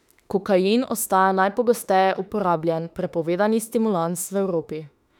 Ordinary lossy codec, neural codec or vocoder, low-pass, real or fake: none; autoencoder, 48 kHz, 32 numbers a frame, DAC-VAE, trained on Japanese speech; 19.8 kHz; fake